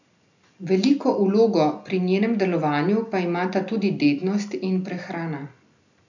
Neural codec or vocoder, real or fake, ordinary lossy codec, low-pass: none; real; none; 7.2 kHz